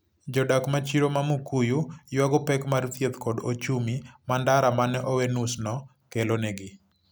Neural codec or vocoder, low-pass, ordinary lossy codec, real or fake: none; none; none; real